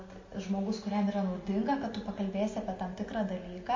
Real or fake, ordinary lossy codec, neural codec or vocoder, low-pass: real; MP3, 32 kbps; none; 7.2 kHz